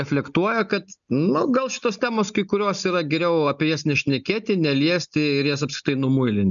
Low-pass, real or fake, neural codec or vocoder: 7.2 kHz; real; none